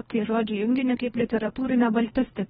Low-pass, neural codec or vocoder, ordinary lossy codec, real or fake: 10.8 kHz; codec, 24 kHz, 1.5 kbps, HILCodec; AAC, 16 kbps; fake